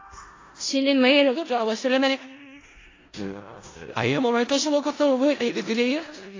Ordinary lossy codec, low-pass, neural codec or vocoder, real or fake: AAC, 32 kbps; 7.2 kHz; codec, 16 kHz in and 24 kHz out, 0.4 kbps, LongCat-Audio-Codec, four codebook decoder; fake